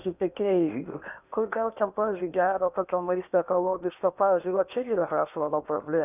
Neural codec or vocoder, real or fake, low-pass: codec, 16 kHz in and 24 kHz out, 0.8 kbps, FocalCodec, streaming, 65536 codes; fake; 3.6 kHz